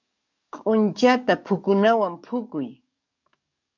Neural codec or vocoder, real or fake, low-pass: codec, 44.1 kHz, 7.8 kbps, DAC; fake; 7.2 kHz